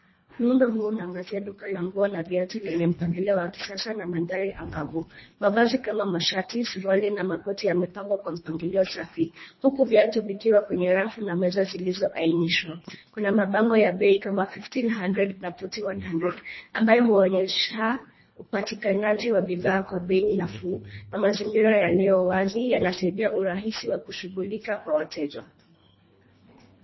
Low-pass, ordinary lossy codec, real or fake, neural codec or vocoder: 7.2 kHz; MP3, 24 kbps; fake; codec, 24 kHz, 1.5 kbps, HILCodec